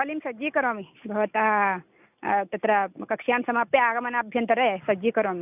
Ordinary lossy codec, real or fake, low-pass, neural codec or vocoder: none; real; 3.6 kHz; none